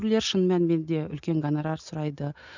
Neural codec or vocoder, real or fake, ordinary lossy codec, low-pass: none; real; none; 7.2 kHz